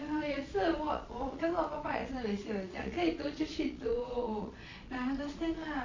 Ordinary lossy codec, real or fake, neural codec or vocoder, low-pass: AAC, 32 kbps; fake; vocoder, 22.05 kHz, 80 mel bands, Vocos; 7.2 kHz